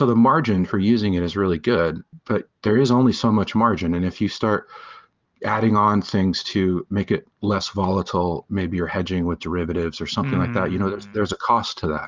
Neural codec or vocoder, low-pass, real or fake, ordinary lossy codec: vocoder, 44.1 kHz, 128 mel bands every 512 samples, BigVGAN v2; 7.2 kHz; fake; Opus, 32 kbps